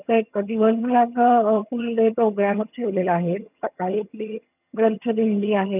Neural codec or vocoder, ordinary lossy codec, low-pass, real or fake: vocoder, 22.05 kHz, 80 mel bands, HiFi-GAN; none; 3.6 kHz; fake